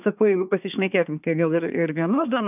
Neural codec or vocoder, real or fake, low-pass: codec, 24 kHz, 1 kbps, SNAC; fake; 3.6 kHz